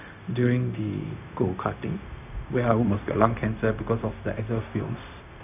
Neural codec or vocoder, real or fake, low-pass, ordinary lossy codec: codec, 16 kHz, 0.4 kbps, LongCat-Audio-Codec; fake; 3.6 kHz; none